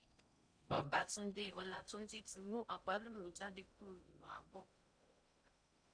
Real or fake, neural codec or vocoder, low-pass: fake; codec, 16 kHz in and 24 kHz out, 0.6 kbps, FocalCodec, streaming, 4096 codes; 9.9 kHz